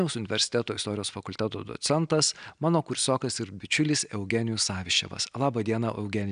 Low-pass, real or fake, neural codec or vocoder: 9.9 kHz; real; none